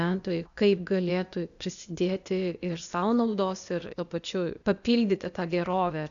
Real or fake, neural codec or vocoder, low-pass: fake; codec, 16 kHz, 0.8 kbps, ZipCodec; 7.2 kHz